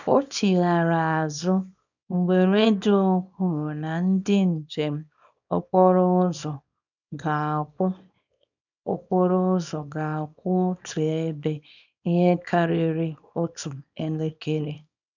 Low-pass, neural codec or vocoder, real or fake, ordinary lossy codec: 7.2 kHz; codec, 24 kHz, 0.9 kbps, WavTokenizer, small release; fake; none